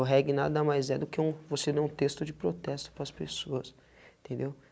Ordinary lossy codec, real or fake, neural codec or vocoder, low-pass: none; real; none; none